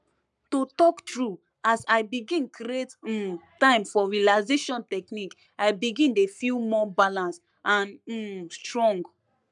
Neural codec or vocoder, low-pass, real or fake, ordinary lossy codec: codec, 44.1 kHz, 7.8 kbps, Pupu-Codec; 10.8 kHz; fake; none